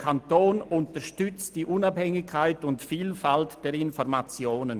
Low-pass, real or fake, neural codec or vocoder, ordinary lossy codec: 14.4 kHz; real; none; Opus, 24 kbps